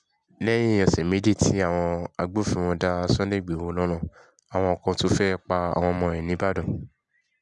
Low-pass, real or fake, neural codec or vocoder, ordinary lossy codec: 10.8 kHz; real; none; none